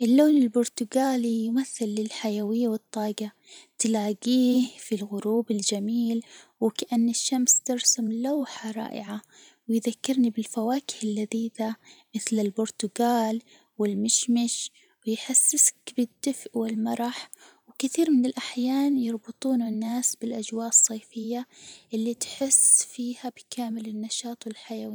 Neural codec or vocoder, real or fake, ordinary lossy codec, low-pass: vocoder, 44.1 kHz, 128 mel bands every 512 samples, BigVGAN v2; fake; none; none